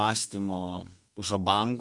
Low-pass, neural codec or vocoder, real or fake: 10.8 kHz; codec, 32 kHz, 1.9 kbps, SNAC; fake